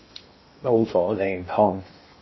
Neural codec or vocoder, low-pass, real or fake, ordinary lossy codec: codec, 16 kHz in and 24 kHz out, 0.8 kbps, FocalCodec, streaming, 65536 codes; 7.2 kHz; fake; MP3, 24 kbps